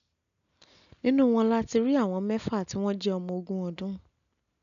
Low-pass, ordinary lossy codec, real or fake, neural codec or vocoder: 7.2 kHz; none; real; none